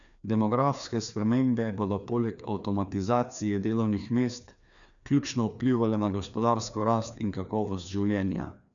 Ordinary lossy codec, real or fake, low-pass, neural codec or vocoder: none; fake; 7.2 kHz; codec, 16 kHz, 2 kbps, FreqCodec, larger model